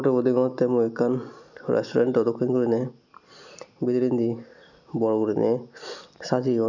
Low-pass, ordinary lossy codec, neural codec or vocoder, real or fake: 7.2 kHz; none; none; real